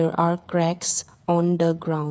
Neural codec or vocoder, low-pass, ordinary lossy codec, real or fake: codec, 16 kHz, 8 kbps, FreqCodec, smaller model; none; none; fake